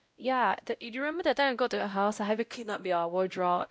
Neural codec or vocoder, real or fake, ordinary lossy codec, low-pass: codec, 16 kHz, 0.5 kbps, X-Codec, WavLM features, trained on Multilingual LibriSpeech; fake; none; none